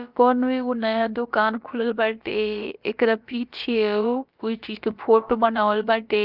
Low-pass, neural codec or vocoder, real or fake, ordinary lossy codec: 5.4 kHz; codec, 16 kHz, about 1 kbps, DyCAST, with the encoder's durations; fake; Opus, 24 kbps